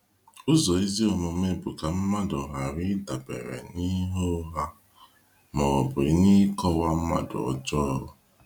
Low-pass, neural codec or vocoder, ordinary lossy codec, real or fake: 19.8 kHz; none; none; real